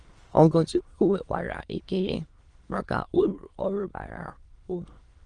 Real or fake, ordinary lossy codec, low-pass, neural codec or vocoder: fake; Opus, 24 kbps; 9.9 kHz; autoencoder, 22.05 kHz, a latent of 192 numbers a frame, VITS, trained on many speakers